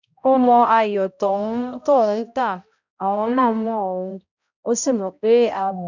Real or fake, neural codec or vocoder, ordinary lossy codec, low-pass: fake; codec, 16 kHz, 0.5 kbps, X-Codec, HuBERT features, trained on balanced general audio; none; 7.2 kHz